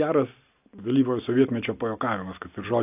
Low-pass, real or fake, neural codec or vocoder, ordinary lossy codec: 3.6 kHz; real; none; AAC, 32 kbps